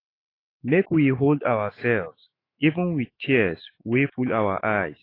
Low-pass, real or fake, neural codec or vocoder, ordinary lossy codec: 5.4 kHz; fake; autoencoder, 48 kHz, 128 numbers a frame, DAC-VAE, trained on Japanese speech; AAC, 24 kbps